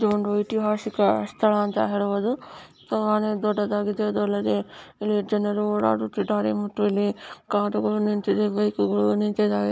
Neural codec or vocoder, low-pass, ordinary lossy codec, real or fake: none; none; none; real